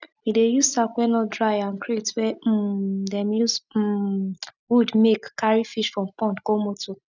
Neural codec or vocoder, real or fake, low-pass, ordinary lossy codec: none; real; 7.2 kHz; none